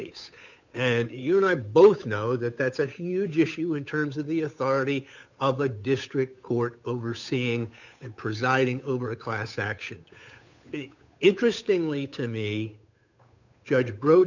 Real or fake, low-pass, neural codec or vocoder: fake; 7.2 kHz; codec, 16 kHz, 8 kbps, FunCodec, trained on Chinese and English, 25 frames a second